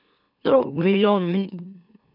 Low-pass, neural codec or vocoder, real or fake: 5.4 kHz; autoencoder, 44.1 kHz, a latent of 192 numbers a frame, MeloTTS; fake